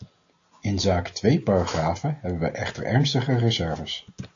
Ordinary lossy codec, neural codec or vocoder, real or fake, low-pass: AAC, 48 kbps; none; real; 7.2 kHz